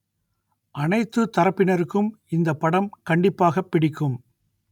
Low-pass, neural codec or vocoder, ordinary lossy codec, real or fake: 19.8 kHz; none; none; real